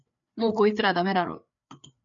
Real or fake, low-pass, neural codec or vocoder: fake; 7.2 kHz; codec, 16 kHz, 4 kbps, FreqCodec, larger model